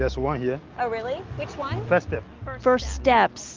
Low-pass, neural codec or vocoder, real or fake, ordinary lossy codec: 7.2 kHz; none; real; Opus, 24 kbps